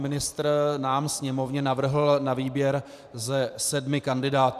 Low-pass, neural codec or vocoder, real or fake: 14.4 kHz; vocoder, 44.1 kHz, 128 mel bands every 512 samples, BigVGAN v2; fake